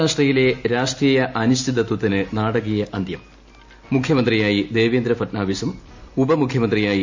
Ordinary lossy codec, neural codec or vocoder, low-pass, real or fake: MP3, 48 kbps; none; 7.2 kHz; real